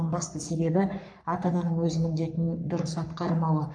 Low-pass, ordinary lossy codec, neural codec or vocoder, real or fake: 9.9 kHz; none; codec, 44.1 kHz, 3.4 kbps, Pupu-Codec; fake